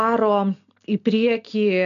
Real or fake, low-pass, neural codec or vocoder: real; 7.2 kHz; none